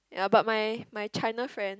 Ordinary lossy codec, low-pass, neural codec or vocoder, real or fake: none; none; none; real